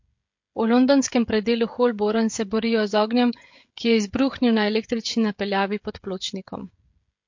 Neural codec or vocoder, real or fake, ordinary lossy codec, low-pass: codec, 16 kHz, 16 kbps, FreqCodec, smaller model; fake; MP3, 48 kbps; 7.2 kHz